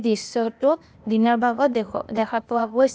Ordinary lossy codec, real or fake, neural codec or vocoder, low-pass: none; fake; codec, 16 kHz, 0.8 kbps, ZipCodec; none